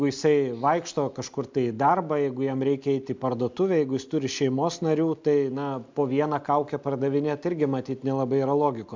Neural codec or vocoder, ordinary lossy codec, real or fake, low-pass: none; MP3, 64 kbps; real; 7.2 kHz